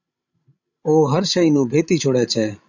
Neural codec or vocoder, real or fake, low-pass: codec, 16 kHz, 16 kbps, FreqCodec, larger model; fake; 7.2 kHz